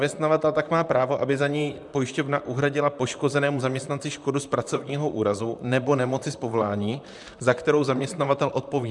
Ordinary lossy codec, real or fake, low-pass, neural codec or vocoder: MP3, 96 kbps; fake; 10.8 kHz; vocoder, 44.1 kHz, 128 mel bands, Pupu-Vocoder